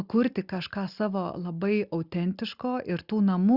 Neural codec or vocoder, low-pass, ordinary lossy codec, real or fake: none; 5.4 kHz; Opus, 64 kbps; real